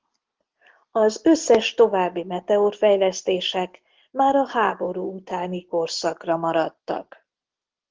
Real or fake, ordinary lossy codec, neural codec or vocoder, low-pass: real; Opus, 16 kbps; none; 7.2 kHz